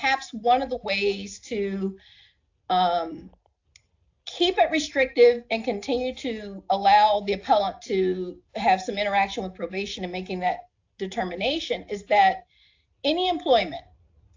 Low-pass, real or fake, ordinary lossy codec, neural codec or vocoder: 7.2 kHz; real; AAC, 48 kbps; none